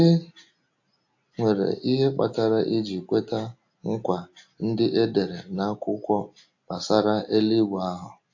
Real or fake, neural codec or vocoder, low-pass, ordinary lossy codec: real; none; 7.2 kHz; AAC, 48 kbps